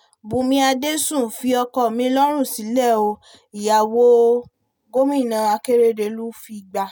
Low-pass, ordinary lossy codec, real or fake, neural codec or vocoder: none; none; real; none